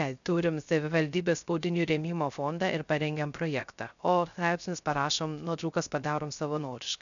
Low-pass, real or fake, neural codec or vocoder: 7.2 kHz; fake; codec, 16 kHz, 0.3 kbps, FocalCodec